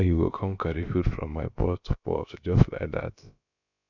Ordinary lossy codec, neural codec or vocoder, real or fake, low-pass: none; codec, 16 kHz, about 1 kbps, DyCAST, with the encoder's durations; fake; 7.2 kHz